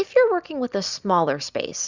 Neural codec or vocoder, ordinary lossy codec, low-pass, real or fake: none; Opus, 64 kbps; 7.2 kHz; real